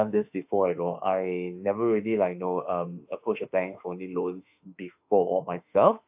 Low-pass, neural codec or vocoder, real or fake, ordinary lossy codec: 3.6 kHz; autoencoder, 48 kHz, 32 numbers a frame, DAC-VAE, trained on Japanese speech; fake; AAC, 32 kbps